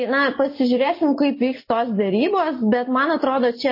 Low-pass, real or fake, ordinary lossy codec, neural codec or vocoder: 5.4 kHz; real; MP3, 24 kbps; none